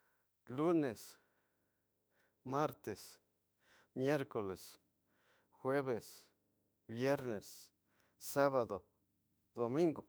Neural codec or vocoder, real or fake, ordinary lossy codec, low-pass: autoencoder, 48 kHz, 32 numbers a frame, DAC-VAE, trained on Japanese speech; fake; none; none